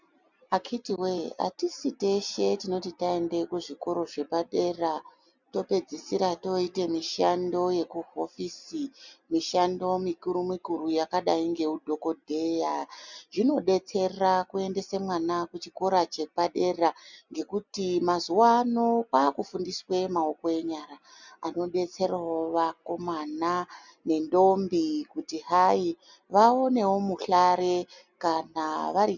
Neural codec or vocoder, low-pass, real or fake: none; 7.2 kHz; real